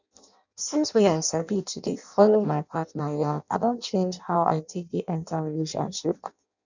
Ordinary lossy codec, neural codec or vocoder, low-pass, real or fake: none; codec, 16 kHz in and 24 kHz out, 0.6 kbps, FireRedTTS-2 codec; 7.2 kHz; fake